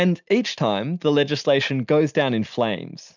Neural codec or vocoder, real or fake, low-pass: none; real; 7.2 kHz